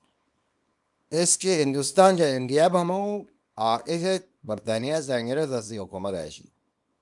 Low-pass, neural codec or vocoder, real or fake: 10.8 kHz; codec, 24 kHz, 0.9 kbps, WavTokenizer, small release; fake